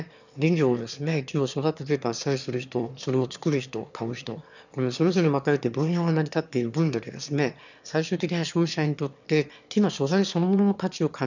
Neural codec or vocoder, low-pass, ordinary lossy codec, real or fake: autoencoder, 22.05 kHz, a latent of 192 numbers a frame, VITS, trained on one speaker; 7.2 kHz; none; fake